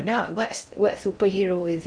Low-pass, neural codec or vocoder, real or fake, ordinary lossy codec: 9.9 kHz; codec, 16 kHz in and 24 kHz out, 0.6 kbps, FocalCodec, streaming, 4096 codes; fake; none